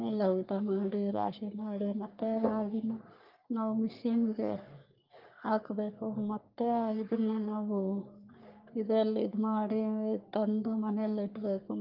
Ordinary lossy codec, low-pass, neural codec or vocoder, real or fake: Opus, 24 kbps; 5.4 kHz; codec, 44.1 kHz, 3.4 kbps, Pupu-Codec; fake